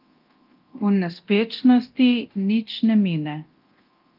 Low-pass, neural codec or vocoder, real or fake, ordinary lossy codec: 5.4 kHz; codec, 24 kHz, 0.9 kbps, DualCodec; fake; Opus, 32 kbps